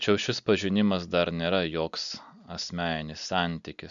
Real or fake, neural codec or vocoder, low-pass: real; none; 7.2 kHz